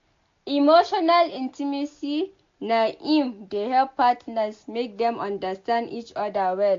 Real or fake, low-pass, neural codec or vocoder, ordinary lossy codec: real; 7.2 kHz; none; AAC, 48 kbps